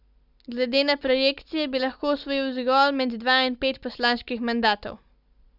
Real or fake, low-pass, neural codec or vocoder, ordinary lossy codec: real; 5.4 kHz; none; Opus, 64 kbps